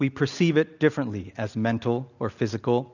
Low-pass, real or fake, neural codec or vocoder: 7.2 kHz; real; none